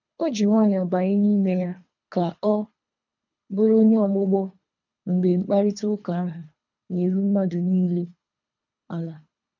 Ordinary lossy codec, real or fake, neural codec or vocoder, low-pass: none; fake; codec, 24 kHz, 3 kbps, HILCodec; 7.2 kHz